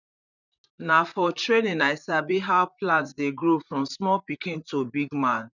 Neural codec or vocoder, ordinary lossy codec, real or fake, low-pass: vocoder, 44.1 kHz, 128 mel bands, Pupu-Vocoder; none; fake; 7.2 kHz